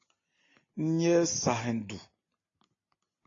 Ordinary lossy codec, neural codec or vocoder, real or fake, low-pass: AAC, 32 kbps; none; real; 7.2 kHz